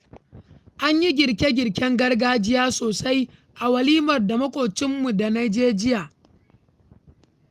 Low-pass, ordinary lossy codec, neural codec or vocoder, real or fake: 14.4 kHz; Opus, 32 kbps; none; real